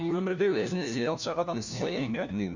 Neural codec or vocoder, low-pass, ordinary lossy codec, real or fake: codec, 16 kHz, 1 kbps, FunCodec, trained on LibriTTS, 50 frames a second; 7.2 kHz; none; fake